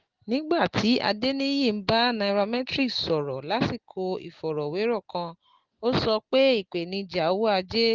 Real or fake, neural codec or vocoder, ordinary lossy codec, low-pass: real; none; Opus, 16 kbps; 7.2 kHz